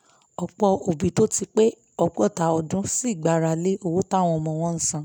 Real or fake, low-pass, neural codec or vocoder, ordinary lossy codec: real; none; none; none